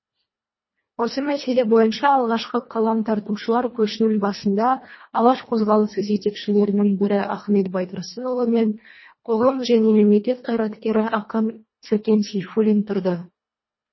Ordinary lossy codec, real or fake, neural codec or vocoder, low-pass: MP3, 24 kbps; fake; codec, 24 kHz, 1.5 kbps, HILCodec; 7.2 kHz